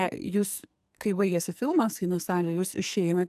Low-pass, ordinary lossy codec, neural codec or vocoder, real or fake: 14.4 kHz; AAC, 96 kbps; codec, 44.1 kHz, 2.6 kbps, SNAC; fake